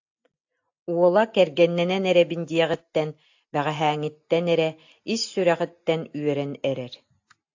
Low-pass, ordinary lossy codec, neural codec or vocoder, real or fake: 7.2 kHz; AAC, 48 kbps; none; real